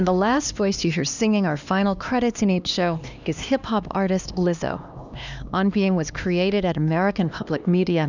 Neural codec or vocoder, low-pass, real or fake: codec, 16 kHz, 2 kbps, X-Codec, HuBERT features, trained on LibriSpeech; 7.2 kHz; fake